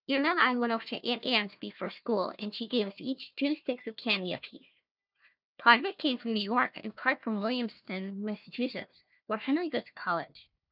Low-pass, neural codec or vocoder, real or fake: 5.4 kHz; codec, 16 kHz, 1 kbps, FunCodec, trained on Chinese and English, 50 frames a second; fake